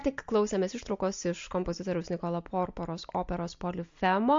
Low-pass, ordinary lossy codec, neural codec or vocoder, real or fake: 7.2 kHz; MP3, 48 kbps; none; real